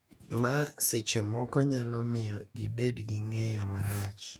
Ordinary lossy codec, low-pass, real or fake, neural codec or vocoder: none; none; fake; codec, 44.1 kHz, 2.6 kbps, DAC